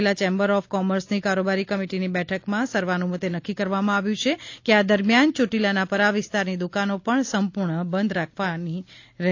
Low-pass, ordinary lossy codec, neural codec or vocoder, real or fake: 7.2 kHz; AAC, 48 kbps; none; real